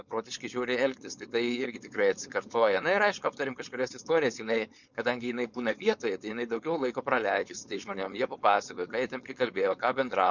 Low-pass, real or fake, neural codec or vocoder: 7.2 kHz; fake; codec, 16 kHz, 4.8 kbps, FACodec